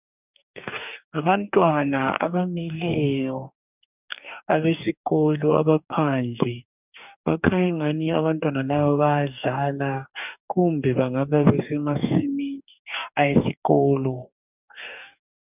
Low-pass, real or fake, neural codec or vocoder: 3.6 kHz; fake; codec, 44.1 kHz, 2.6 kbps, DAC